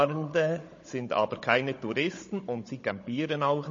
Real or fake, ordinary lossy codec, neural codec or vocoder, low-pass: fake; MP3, 32 kbps; codec, 16 kHz, 16 kbps, FunCodec, trained on Chinese and English, 50 frames a second; 7.2 kHz